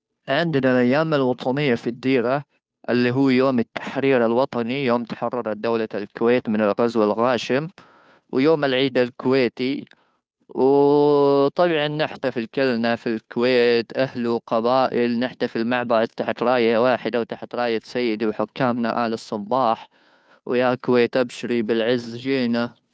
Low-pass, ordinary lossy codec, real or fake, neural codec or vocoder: none; none; fake; codec, 16 kHz, 2 kbps, FunCodec, trained on Chinese and English, 25 frames a second